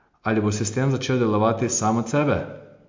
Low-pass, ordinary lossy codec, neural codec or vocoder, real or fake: 7.2 kHz; MP3, 64 kbps; none; real